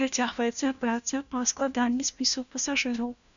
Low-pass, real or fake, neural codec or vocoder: 7.2 kHz; fake; codec, 16 kHz, 1 kbps, FunCodec, trained on LibriTTS, 50 frames a second